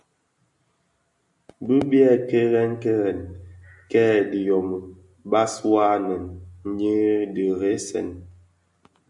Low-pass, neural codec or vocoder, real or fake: 10.8 kHz; none; real